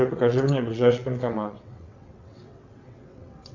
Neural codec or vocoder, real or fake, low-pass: vocoder, 22.05 kHz, 80 mel bands, WaveNeXt; fake; 7.2 kHz